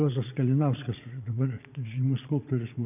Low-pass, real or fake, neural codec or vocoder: 3.6 kHz; fake; vocoder, 22.05 kHz, 80 mel bands, Vocos